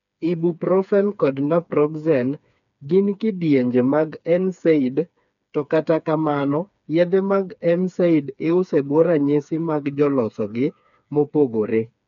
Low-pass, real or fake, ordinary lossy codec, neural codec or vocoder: 7.2 kHz; fake; none; codec, 16 kHz, 4 kbps, FreqCodec, smaller model